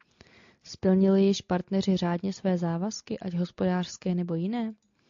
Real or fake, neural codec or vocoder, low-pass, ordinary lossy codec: real; none; 7.2 kHz; AAC, 64 kbps